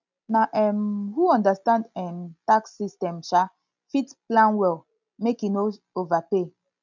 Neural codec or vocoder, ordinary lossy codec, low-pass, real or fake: none; none; 7.2 kHz; real